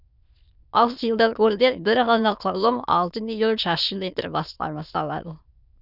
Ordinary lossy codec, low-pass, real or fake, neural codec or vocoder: none; 5.4 kHz; fake; autoencoder, 22.05 kHz, a latent of 192 numbers a frame, VITS, trained on many speakers